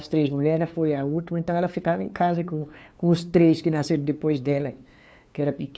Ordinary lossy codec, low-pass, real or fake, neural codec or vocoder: none; none; fake; codec, 16 kHz, 2 kbps, FunCodec, trained on LibriTTS, 25 frames a second